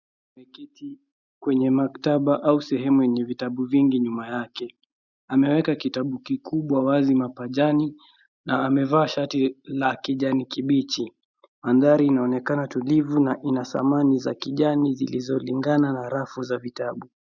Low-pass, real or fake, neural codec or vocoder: 7.2 kHz; real; none